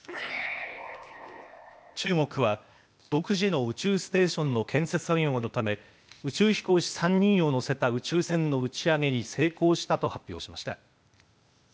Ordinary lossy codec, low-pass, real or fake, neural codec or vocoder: none; none; fake; codec, 16 kHz, 0.8 kbps, ZipCodec